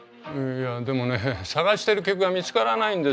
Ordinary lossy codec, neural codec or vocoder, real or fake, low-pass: none; none; real; none